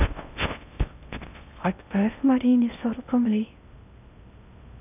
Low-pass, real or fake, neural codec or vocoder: 3.6 kHz; fake; codec, 16 kHz in and 24 kHz out, 0.6 kbps, FocalCodec, streaming, 4096 codes